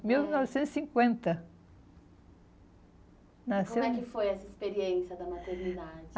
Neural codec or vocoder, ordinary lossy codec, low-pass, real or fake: none; none; none; real